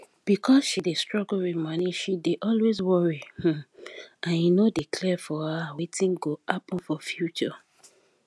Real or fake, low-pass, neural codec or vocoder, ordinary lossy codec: real; none; none; none